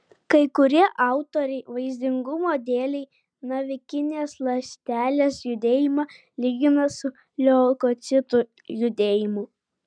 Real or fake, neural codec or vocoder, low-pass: real; none; 9.9 kHz